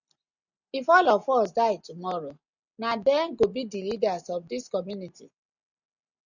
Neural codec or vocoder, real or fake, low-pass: none; real; 7.2 kHz